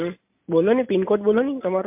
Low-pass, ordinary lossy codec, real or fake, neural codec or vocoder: 3.6 kHz; MP3, 32 kbps; real; none